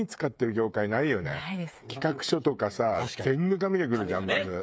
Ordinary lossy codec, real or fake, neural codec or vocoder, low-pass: none; fake; codec, 16 kHz, 8 kbps, FreqCodec, smaller model; none